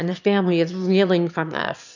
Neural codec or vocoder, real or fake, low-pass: autoencoder, 22.05 kHz, a latent of 192 numbers a frame, VITS, trained on one speaker; fake; 7.2 kHz